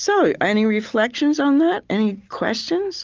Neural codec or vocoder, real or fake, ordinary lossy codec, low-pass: none; real; Opus, 32 kbps; 7.2 kHz